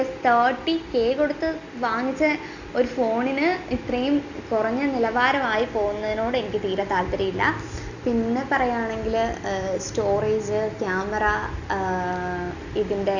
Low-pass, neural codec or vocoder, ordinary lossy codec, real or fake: 7.2 kHz; none; Opus, 64 kbps; real